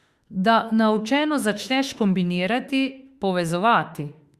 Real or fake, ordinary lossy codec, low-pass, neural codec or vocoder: fake; Opus, 64 kbps; 14.4 kHz; autoencoder, 48 kHz, 32 numbers a frame, DAC-VAE, trained on Japanese speech